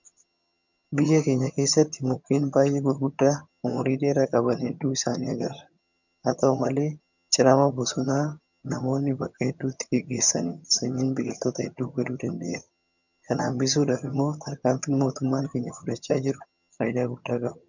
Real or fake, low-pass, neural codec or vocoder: fake; 7.2 kHz; vocoder, 22.05 kHz, 80 mel bands, HiFi-GAN